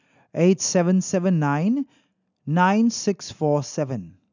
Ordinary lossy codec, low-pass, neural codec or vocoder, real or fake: none; 7.2 kHz; none; real